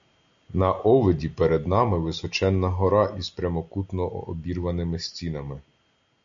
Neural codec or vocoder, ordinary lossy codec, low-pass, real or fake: none; MP3, 64 kbps; 7.2 kHz; real